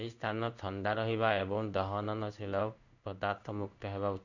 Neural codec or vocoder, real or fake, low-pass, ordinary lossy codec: codec, 16 kHz in and 24 kHz out, 1 kbps, XY-Tokenizer; fake; 7.2 kHz; none